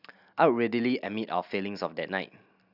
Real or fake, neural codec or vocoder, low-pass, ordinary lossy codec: real; none; 5.4 kHz; none